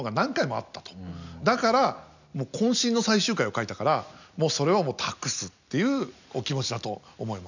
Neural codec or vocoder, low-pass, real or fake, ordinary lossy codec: none; 7.2 kHz; real; none